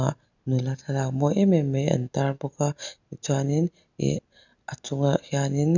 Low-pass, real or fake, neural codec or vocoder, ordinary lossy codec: 7.2 kHz; real; none; none